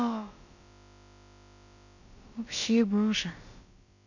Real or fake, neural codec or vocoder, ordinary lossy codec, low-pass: fake; codec, 16 kHz, about 1 kbps, DyCAST, with the encoder's durations; none; 7.2 kHz